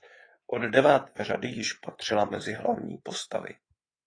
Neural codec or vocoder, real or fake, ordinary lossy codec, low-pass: codec, 24 kHz, 3.1 kbps, DualCodec; fake; AAC, 32 kbps; 9.9 kHz